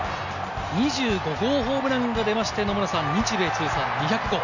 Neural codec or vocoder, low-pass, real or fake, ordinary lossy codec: none; 7.2 kHz; real; none